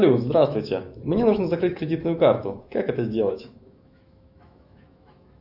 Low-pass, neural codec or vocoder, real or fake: 5.4 kHz; autoencoder, 48 kHz, 128 numbers a frame, DAC-VAE, trained on Japanese speech; fake